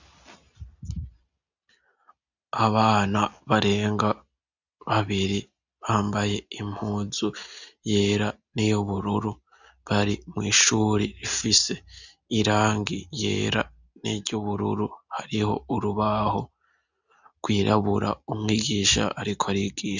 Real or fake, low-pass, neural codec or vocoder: real; 7.2 kHz; none